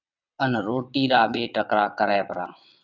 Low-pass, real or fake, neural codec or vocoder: 7.2 kHz; fake; vocoder, 22.05 kHz, 80 mel bands, WaveNeXt